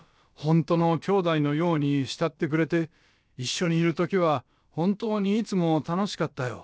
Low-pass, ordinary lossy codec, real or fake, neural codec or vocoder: none; none; fake; codec, 16 kHz, about 1 kbps, DyCAST, with the encoder's durations